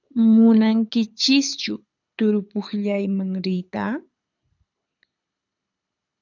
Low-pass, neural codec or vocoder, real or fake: 7.2 kHz; codec, 24 kHz, 6 kbps, HILCodec; fake